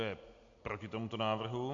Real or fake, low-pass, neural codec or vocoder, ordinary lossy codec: real; 7.2 kHz; none; MP3, 64 kbps